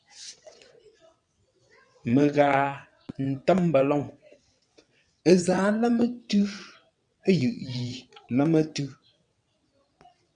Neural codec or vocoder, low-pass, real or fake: vocoder, 22.05 kHz, 80 mel bands, WaveNeXt; 9.9 kHz; fake